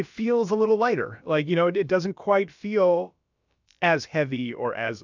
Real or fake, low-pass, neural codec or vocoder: fake; 7.2 kHz; codec, 16 kHz, about 1 kbps, DyCAST, with the encoder's durations